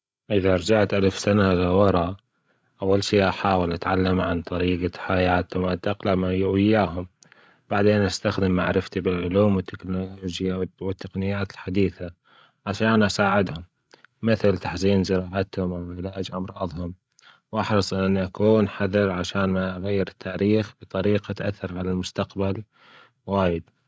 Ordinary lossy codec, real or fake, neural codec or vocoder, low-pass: none; fake; codec, 16 kHz, 16 kbps, FreqCodec, larger model; none